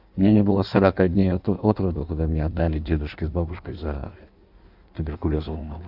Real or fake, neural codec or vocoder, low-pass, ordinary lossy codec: fake; codec, 16 kHz in and 24 kHz out, 1.1 kbps, FireRedTTS-2 codec; 5.4 kHz; none